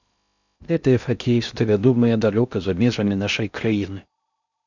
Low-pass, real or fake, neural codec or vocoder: 7.2 kHz; fake; codec, 16 kHz in and 24 kHz out, 0.6 kbps, FocalCodec, streaming, 2048 codes